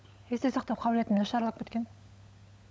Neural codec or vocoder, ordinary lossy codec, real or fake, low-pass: codec, 16 kHz, 16 kbps, FunCodec, trained on LibriTTS, 50 frames a second; none; fake; none